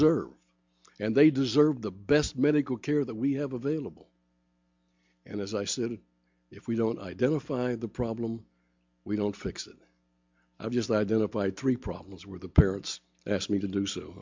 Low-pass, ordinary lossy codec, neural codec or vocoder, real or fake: 7.2 kHz; MP3, 64 kbps; none; real